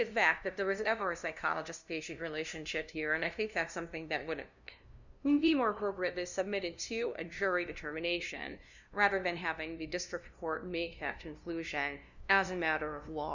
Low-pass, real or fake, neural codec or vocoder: 7.2 kHz; fake; codec, 16 kHz, 0.5 kbps, FunCodec, trained on LibriTTS, 25 frames a second